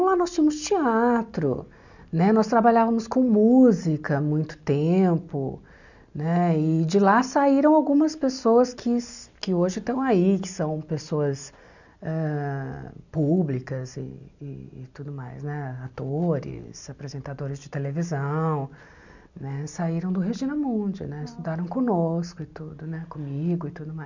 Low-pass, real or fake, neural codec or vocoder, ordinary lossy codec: 7.2 kHz; real; none; none